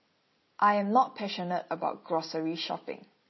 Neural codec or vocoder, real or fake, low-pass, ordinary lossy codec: none; real; 7.2 kHz; MP3, 24 kbps